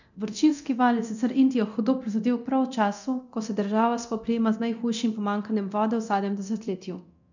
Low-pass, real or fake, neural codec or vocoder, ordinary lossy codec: 7.2 kHz; fake; codec, 24 kHz, 0.9 kbps, DualCodec; none